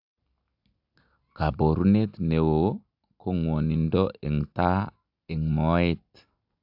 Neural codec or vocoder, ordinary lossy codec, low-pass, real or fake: none; AAC, 48 kbps; 5.4 kHz; real